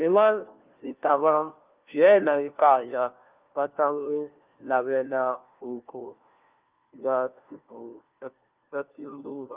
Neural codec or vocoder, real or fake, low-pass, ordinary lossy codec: codec, 16 kHz, 1 kbps, FunCodec, trained on LibriTTS, 50 frames a second; fake; 3.6 kHz; Opus, 32 kbps